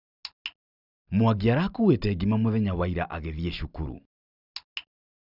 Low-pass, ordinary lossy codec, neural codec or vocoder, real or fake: 5.4 kHz; none; none; real